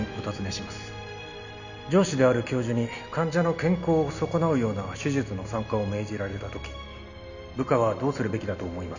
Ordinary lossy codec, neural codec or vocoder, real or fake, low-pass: none; none; real; 7.2 kHz